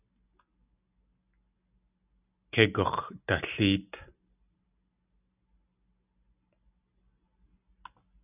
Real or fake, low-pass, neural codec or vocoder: real; 3.6 kHz; none